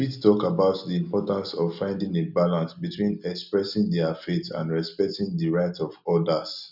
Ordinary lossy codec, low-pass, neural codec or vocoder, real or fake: none; 5.4 kHz; none; real